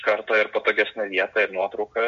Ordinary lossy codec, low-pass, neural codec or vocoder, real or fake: MP3, 48 kbps; 7.2 kHz; none; real